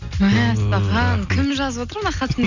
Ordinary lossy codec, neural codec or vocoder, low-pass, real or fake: none; none; 7.2 kHz; real